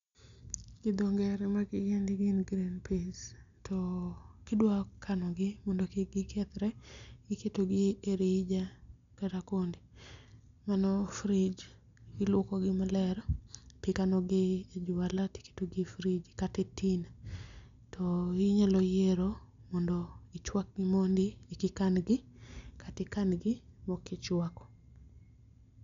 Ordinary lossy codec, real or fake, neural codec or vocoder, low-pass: MP3, 64 kbps; real; none; 7.2 kHz